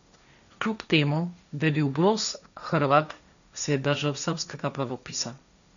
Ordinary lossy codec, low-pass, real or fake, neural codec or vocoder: none; 7.2 kHz; fake; codec, 16 kHz, 1.1 kbps, Voila-Tokenizer